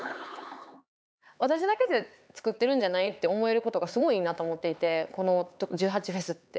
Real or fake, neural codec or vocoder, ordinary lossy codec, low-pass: fake; codec, 16 kHz, 4 kbps, X-Codec, HuBERT features, trained on LibriSpeech; none; none